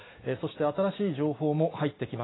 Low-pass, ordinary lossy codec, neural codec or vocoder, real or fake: 7.2 kHz; AAC, 16 kbps; none; real